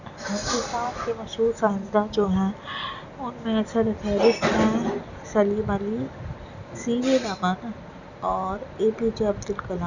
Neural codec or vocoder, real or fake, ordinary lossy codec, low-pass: none; real; none; 7.2 kHz